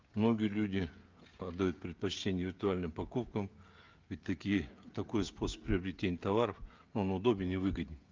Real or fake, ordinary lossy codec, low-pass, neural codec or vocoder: fake; Opus, 32 kbps; 7.2 kHz; codec, 16 kHz, 16 kbps, FreqCodec, smaller model